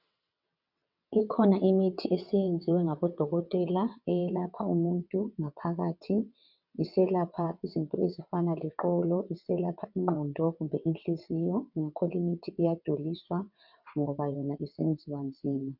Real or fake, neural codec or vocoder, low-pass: fake; vocoder, 22.05 kHz, 80 mel bands, WaveNeXt; 5.4 kHz